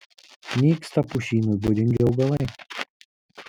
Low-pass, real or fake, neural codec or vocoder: 19.8 kHz; real; none